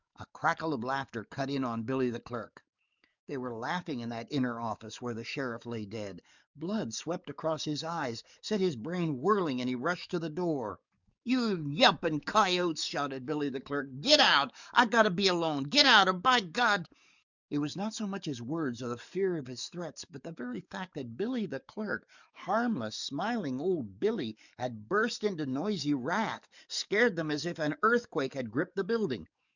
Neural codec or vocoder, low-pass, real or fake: codec, 44.1 kHz, 7.8 kbps, DAC; 7.2 kHz; fake